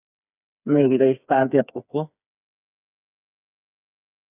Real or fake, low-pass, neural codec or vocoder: fake; 3.6 kHz; codec, 16 kHz, 4 kbps, FreqCodec, smaller model